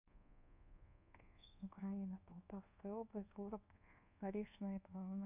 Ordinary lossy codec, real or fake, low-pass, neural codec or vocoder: none; fake; 3.6 kHz; codec, 24 kHz, 1.2 kbps, DualCodec